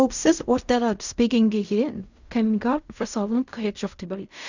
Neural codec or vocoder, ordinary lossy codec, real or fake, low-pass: codec, 16 kHz in and 24 kHz out, 0.4 kbps, LongCat-Audio-Codec, fine tuned four codebook decoder; none; fake; 7.2 kHz